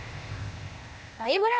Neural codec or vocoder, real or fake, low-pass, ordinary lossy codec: codec, 16 kHz, 0.8 kbps, ZipCodec; fake; none; none